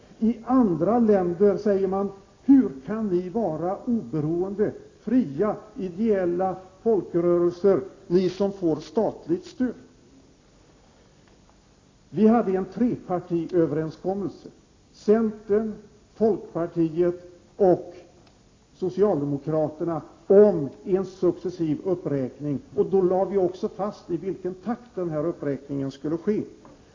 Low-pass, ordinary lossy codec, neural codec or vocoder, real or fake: 7.2 kHz; AAC, 32 kbps; none; real